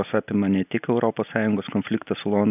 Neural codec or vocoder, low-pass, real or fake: none; 3.6 kHz; real